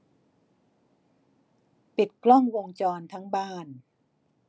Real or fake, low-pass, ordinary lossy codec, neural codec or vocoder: real; none; none; none